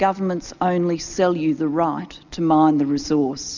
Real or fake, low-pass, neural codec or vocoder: real; 7.2 kHz; none